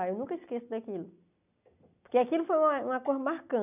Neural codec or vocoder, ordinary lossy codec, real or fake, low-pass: none; none; real; 3.6 kHz